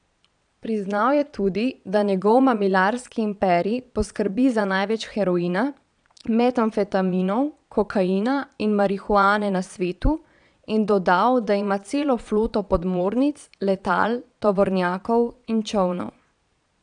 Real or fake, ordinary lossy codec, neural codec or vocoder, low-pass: fake; none; vocoder, 22.05 kHz, 80 mel bands, Vocos; 9.9 kHz